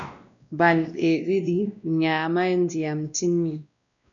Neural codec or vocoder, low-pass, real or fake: codec, 16 kHz, 1 kbps, X-Codec, WavLM features, trained on Multilingual LibriSpeech; 7.2 kHz; fake